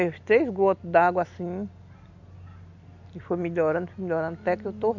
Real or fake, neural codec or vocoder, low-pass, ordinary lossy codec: real; none; 7.2 kHz; none